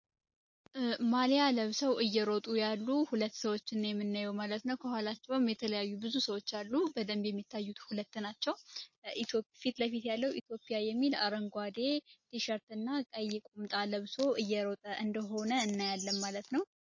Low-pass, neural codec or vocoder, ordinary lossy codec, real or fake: 7.2 kHz; none; MP3, 32 kbps; real